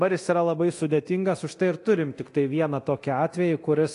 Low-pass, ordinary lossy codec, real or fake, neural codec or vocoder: 10.8 kHz; AAC, 48 kbps; fake; codec, 24 kHz, 0.9 kbps, DualCodec